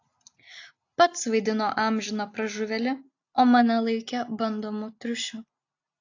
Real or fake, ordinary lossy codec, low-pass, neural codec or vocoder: real; AAC, 48 kbps; 7.2 kHz; none